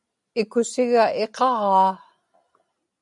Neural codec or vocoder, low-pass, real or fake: none; 10.8 kHz; real